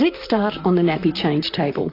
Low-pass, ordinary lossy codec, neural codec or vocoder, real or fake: 5.4 kHz; AAC, 32 kbps; vocoder, 44.1 kHz, 128 mel bands, Pupu-Vocoder; fake